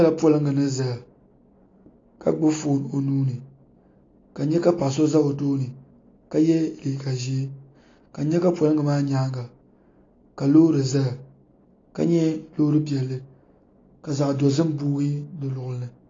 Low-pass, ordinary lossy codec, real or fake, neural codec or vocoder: 7.2 kHz; AAC, 32 kbps; real; none